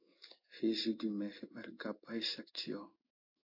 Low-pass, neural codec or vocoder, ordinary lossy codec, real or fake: 5.4 kHz; codec, 16 kHz in and 24 kHz out, 1 kbps, XY-Tokenizer; AAC, 32 kbps; fake